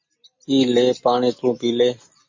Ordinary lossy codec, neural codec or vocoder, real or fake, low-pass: MP3, 32 kbps; none; real; 7.2 kHz